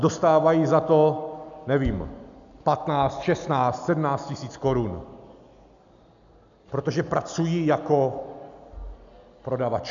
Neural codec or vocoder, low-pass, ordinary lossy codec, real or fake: none; 7.2 kHz; MP3, 96 kbps; real